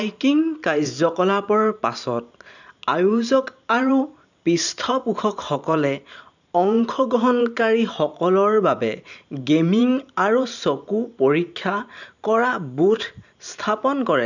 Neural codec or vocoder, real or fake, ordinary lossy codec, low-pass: vocoder, 44.1 kHz, 128 mel bands every 512 samples, BigVGAN v2; fake; none; 7.2 kHz